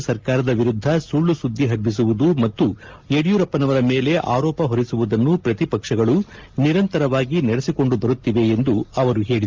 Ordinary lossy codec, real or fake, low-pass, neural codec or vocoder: Opus, 24 kbps; real; 7.2 kHz; none